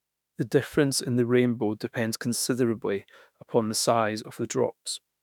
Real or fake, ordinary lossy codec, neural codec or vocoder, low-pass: fake; none; autoencoder, 48 kHz, 32 numbers a frame, DAC-VAE, trained on Japanese speech; 19.8 kHz